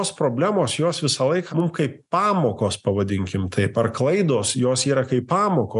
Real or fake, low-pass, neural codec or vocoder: real; 10.8 kHz; none